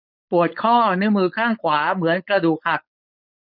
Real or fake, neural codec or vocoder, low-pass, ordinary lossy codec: fake; codec, 16 kHz, 4.8 kbps, FACodec; 5.4 kHz; none